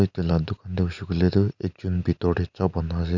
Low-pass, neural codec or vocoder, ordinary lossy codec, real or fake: 7.2 kHz; none; none; real